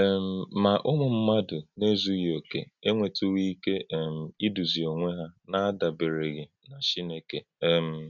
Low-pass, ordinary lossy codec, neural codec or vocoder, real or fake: 7.2 kHz; none; none; real